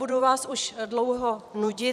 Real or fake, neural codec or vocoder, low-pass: fake; vocoder, 44.1 kHz, 128 mel bands every 256 samples, BigVGAN v2; 14.4 kHz